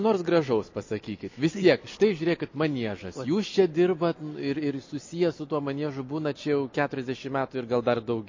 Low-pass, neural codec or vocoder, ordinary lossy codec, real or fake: 7.2 kHz; none; MP3, 32 kbps; real